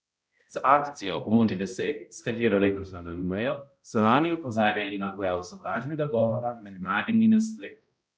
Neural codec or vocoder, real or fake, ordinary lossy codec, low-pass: codec, 16 kHz, 0.5 kbps, X-Codec, HuBERT features, trained on balanced general audio; fake; none; none